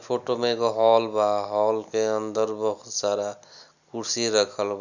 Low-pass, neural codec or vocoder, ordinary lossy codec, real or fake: 7.2 kHz; none; none; real